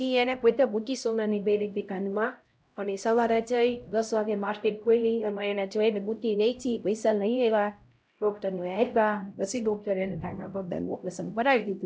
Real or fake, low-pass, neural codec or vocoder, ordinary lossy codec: fake; none; codec, 16 kHz, 0.5 kbps, X-Codec, HuBERT features, trained on LibriSpeech; none